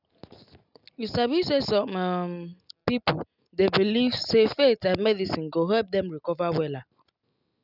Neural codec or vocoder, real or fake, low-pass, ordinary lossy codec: none; real; 5.4 kHz; none